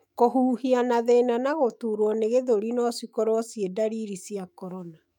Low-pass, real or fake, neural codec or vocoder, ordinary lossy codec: 19.8 kHz; fake; autoencoder, 48 kHz, 128 numbers a frame, DAC-VAE, trained on Japanese speech; MP3, 96 kbps